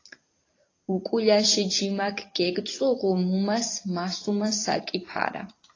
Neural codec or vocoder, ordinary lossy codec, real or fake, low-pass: vocoder, 44.1 kHz, 128 mel bands every 256 samples, BigVGAN v2; AAC, 32 kbps; fake; 7.2 kHz